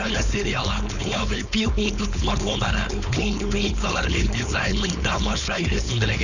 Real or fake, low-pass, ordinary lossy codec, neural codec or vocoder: fake; 7.2 kHz; none; codec, 16 kHz, 4.8 kbps, FACodec